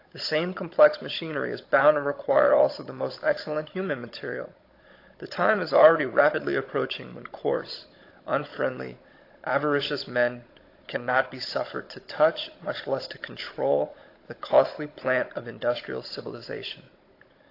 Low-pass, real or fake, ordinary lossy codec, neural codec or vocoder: 5.4 kHz; fake; AAC, 32 kbps; codec, 16 kHz, 16 kbps, FunCodec, trained on Chinese and English, 50 frames a second